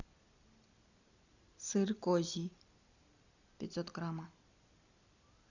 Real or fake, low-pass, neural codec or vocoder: real; 7.2 kHz; none